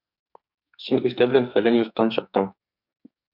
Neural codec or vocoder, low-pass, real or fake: codec, 44.1 kHz, 2.6 kbps, SNAC; 5.4 kHz; fake